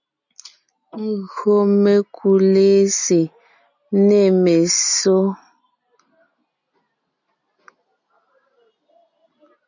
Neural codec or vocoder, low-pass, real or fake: none; 7.2 kHz; real